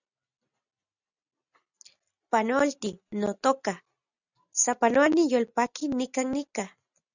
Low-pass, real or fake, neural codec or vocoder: 7.2 kHz; real; none